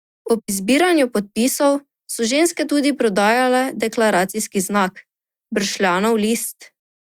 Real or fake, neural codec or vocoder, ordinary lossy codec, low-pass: real; none; Opus, 64 kbps; 19.8 kHz